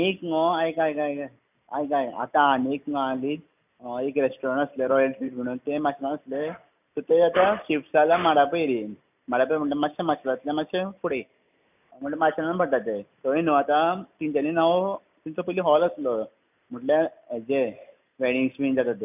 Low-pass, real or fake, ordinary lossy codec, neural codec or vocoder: 3.6 kHz; real; none; none